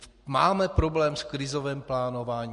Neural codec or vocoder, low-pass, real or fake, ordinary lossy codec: none; 14.4 kHz; real; MP3, 48 kbps